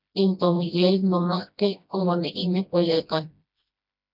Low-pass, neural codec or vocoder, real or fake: 5.4 kHz; codec, 16 kHz, 1 kbps, FreqCodec, smaller model; fake